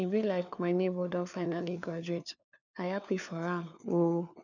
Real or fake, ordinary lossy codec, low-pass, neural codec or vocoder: fake; none; 7.2 kHz; codec, 16 kHz, 4 kbps, FunCodec, trained on LibriTTS, 50 frames a second